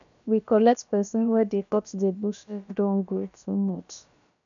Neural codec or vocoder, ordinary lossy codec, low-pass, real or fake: codec, 16 kHz, about 1 kbps, DyCAST, with the encoder's durations; MP3, 96 kbps; 7.2 kHz; fake